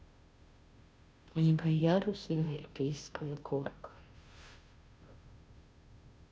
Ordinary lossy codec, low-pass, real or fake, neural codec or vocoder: none; none; fake; codec, 16 kHz, 0.5 kbps, FunCodec, trained on Chinese and English, 25 frames a second